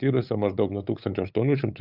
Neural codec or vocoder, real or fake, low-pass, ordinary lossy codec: codec, 16 kHz, 8 kbps, FunCodec, trained on LibriTTS, 25 frames a second; fake; 5.4 kHz; Opus, 64 kbps